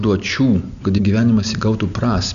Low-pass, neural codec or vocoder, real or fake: 7.2 kHz; none; real